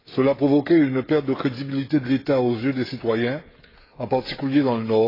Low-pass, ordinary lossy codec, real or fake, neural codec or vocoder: 5.4 kHz; AAC, 24 kbps; fake; codec, 16 kHz, 16 kbps, FreqCodec, smaller model